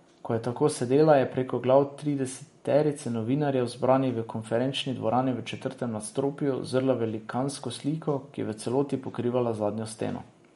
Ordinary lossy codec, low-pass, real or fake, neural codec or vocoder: MP3, 48 kbps; 19.8 kHz; real; none